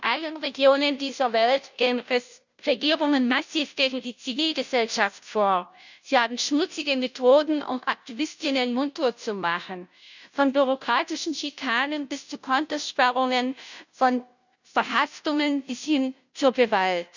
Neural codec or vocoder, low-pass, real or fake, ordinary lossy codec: codec, 16 kHz, 0.5 kbps, FunCodec, trained on Chinese and English, 25 frames a second; 7.2 kHz; fake; none